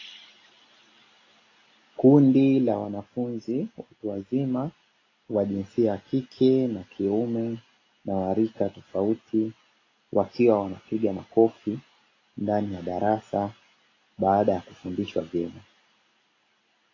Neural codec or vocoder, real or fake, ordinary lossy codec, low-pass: none; real; AAC, 32 kbps; 7.2 kHz